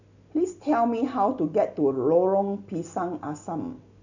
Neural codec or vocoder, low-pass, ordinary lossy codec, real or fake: none; 7.2 kHz; none; real